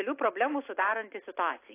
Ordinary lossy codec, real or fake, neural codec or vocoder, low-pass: AAC, 24 kbps; real; none; 3.6 kHz